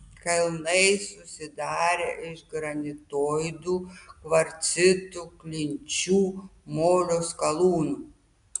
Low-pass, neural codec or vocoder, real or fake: 10.8 kHz; none; real